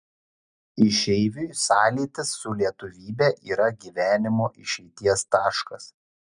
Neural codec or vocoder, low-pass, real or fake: none; 9.9 kHz; real